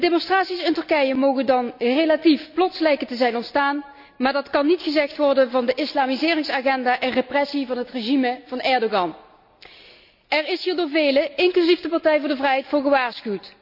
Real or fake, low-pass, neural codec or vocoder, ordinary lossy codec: real; 5.4 kHz; none; none